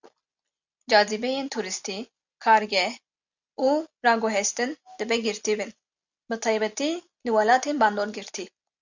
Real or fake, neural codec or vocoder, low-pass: real; none; 7.2 kHz